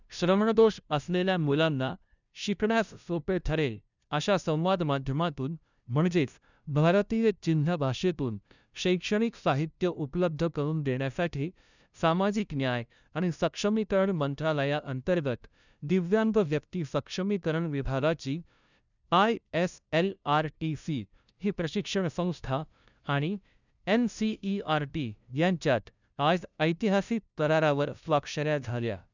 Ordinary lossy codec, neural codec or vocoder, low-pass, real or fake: none; codec, 16 kHz, 0.5 kbps, FunCodec, trained on LibriTTS, 25 frames a second; 7.2 kHz; fake